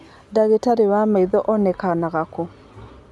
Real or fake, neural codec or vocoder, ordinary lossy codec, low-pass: real; none; none; none